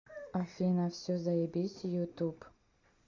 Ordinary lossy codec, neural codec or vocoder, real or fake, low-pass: MP3, 64 kbps; none; real; 7.2 kHz